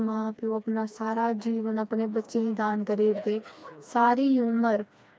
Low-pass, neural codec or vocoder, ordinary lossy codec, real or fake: none; codec, 16 kHz, 2 kbps, FreqCodec, smaller model; none; fake